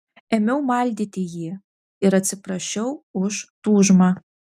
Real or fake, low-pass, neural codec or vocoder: real; 14.4 kHz; none